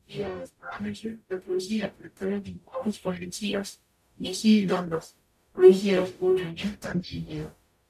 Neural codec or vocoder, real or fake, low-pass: codec, 44.1 kHz, 0.9 kbps, DAC; fake; 14.4 kHz